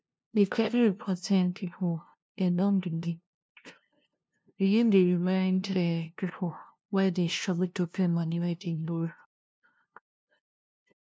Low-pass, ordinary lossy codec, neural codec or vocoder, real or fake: none; none; codec, 16 kHz, 0.5 kbps, FunCodec, trained on LibriTTS, 25 frames a second; fake